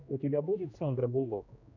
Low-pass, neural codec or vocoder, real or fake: 7.2 kHz; codec, 16 kHz, 1 kbps, X-Codec, HuBERT features, trained on general audio; fake